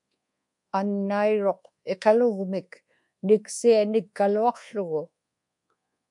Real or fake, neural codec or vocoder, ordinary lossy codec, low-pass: fake; codec, 24 kHz, 1.2 kbps, DualCodec; MP3, 64 kbps; 10.8 kHz